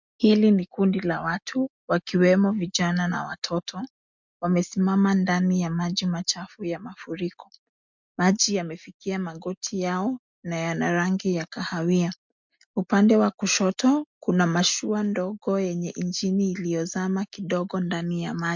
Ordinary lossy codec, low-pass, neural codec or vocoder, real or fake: MP3, 64 kbps; 7.2 kHz; none; real